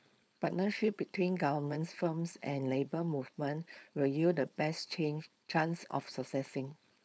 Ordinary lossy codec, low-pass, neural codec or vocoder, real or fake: none; none; codec, 16 kHz, 4.8 kbps, FACodec; fake